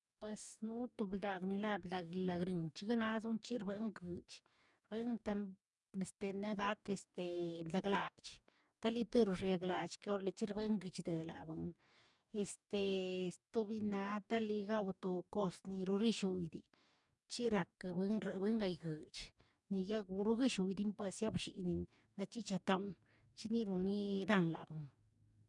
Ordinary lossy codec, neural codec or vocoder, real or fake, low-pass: none; codec, 44.1 kHz, 2.6 kbps, DAC; fake; 10.8 kHz